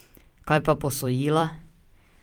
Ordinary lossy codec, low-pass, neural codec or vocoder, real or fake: Opus, 32 kbps; 19.8 kHz; vocoder, 44.1 kHz, 128 mel bands every 256 samples, BigVGAN v2; fake